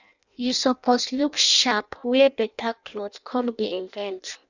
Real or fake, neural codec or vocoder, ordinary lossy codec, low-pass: fake; codec, 16 kHz in and 24 kHz out, 0.6 kbps, FireRedTTS-2 codec; none; 7.2 kHz